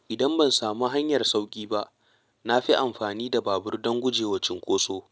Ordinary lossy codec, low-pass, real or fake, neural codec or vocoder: none; none; real; none